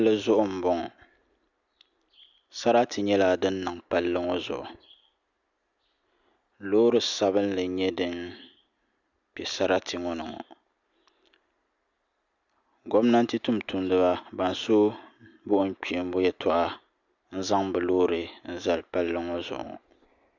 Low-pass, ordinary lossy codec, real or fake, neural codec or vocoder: 7.2 kHz; Opus, 64 kbps; real; none